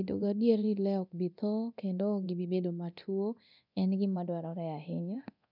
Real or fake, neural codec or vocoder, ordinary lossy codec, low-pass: fake; codec, 24 kHz, 0.9 kbps, DualCodec; none; 5.4 kHz